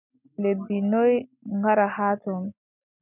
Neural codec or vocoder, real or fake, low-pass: none; real; 3.6 kHz